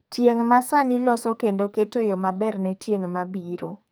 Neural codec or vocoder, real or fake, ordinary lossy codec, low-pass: codec, 44.1 kHz, 2.6 kbps, SNAC; fake; none; none